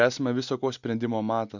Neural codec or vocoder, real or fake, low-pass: none; real; 7.2 kHz